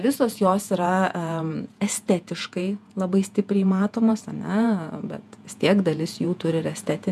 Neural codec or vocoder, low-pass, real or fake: vocoder, 48 kHz, 128 mel bands, Vocos; 14.4 kHz; fake